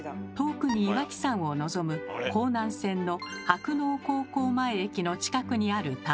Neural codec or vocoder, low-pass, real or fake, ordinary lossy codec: none; none; real; none